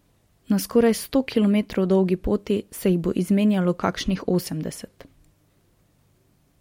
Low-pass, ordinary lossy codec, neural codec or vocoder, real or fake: 19.8 kHz; MP3, 64 kbps; none; real